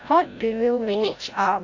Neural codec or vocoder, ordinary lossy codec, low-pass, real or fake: codec, 16 kHz, 0.5 kbps, FreqCodec, larger model; AAC, 48 kbps; 7.2 kHz; fake